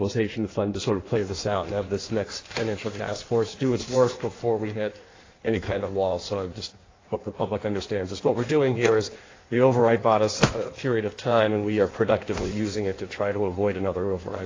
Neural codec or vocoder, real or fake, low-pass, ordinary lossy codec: codec, 16 kHz in and 24 kHz out, 1.1 kbps, FireRedTTS-2 codec; fake; 7.2 kHz; AAC, 32 kbps